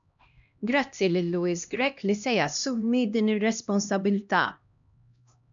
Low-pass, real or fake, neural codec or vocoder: 7.2 kHz; fake; codec, 16 kHz, 1 kbps, X-Codec, HuBERT features, trained on LibriSpeech